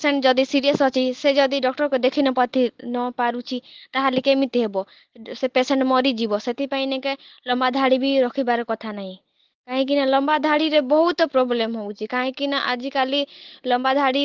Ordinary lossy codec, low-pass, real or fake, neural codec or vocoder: Opus, 16 kbps; 7.2 kHz; real; none